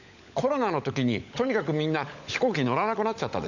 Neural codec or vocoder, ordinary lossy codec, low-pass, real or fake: codec, 16 kHz, 16 kbps, FunCodec, trained on LibriTTS, 50 frames a second; none; 7.2 kHz; fake